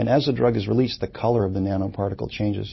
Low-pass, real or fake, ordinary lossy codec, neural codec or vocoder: 7.2 kHz; real; MP3, 24 kbps; none